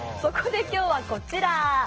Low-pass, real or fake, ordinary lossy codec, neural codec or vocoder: 7.2 kHz; real; Opus, 16 kbps; none